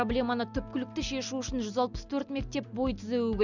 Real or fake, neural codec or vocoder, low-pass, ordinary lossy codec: real; none; 7.2 kHz; none